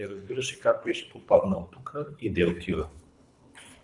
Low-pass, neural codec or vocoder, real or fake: 10.8 kHz; codec, 24 kHz, 3 kbps, HILCodec; fake